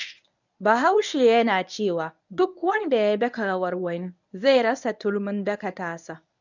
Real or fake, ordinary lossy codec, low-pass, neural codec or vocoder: fake; none; 7.2 kHz; codec, 24 kHz, 0.9 kbps, WavTokenizer, medium speech release version 1